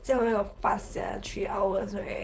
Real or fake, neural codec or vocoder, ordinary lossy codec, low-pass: fake; codec, 16 kHz, 4.8 kbps, FACodec; none; none